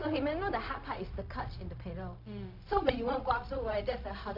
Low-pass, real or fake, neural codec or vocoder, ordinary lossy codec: 5.4 kHz; fake; codec, 16 kHz, 0.4 kbps, LongCat-Audio-Codec; none